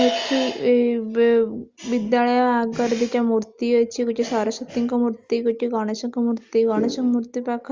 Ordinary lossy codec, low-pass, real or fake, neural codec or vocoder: Opus, 32 kbps; 7.2 kHz; real; none